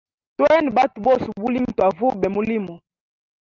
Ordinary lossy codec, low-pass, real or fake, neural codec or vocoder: Opus, 24 kbps; 7.2 kHz; real; none